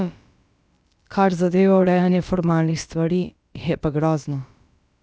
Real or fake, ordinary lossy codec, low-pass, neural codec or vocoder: fake; none; none; codec, 16 kHz, about 1 kbps, DyCAST, with the encoder's durations